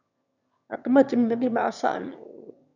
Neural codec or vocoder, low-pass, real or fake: autoencoder, 22.05 kHz, a latent of 192 numbers a frame, VITS, trained on one speaker; 7.2 kHz; fake